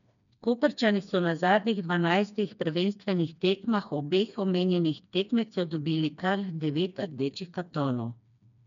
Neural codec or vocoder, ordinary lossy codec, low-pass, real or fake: codec, 16 kHz, 2 kbps, FreqCodec, smaller model; none; 7.2 kHz; fake